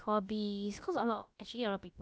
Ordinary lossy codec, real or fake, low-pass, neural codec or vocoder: none; fake; none; codec, 16 kHz, about 1 kbps, DyCAST, with the encoder's durations